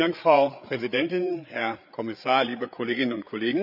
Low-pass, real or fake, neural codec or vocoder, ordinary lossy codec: 5.4 kHz; fake; codec, 16 kHz, 8 kbps, FreqCodec, larger model; none